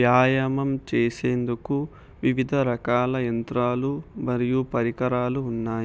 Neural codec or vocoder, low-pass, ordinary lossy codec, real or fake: none; none; none; real